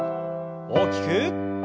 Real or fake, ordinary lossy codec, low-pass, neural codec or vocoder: real; none; none; none